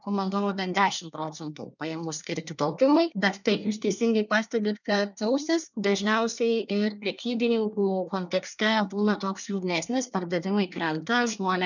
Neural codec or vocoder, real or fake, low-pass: codec, 24 kHz, 1 kbps, SNAC; fake; 7.2 kHz